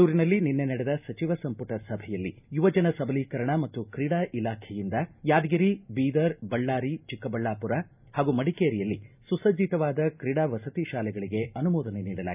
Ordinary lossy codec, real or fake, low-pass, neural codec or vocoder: none; real; 3.6 kHz; none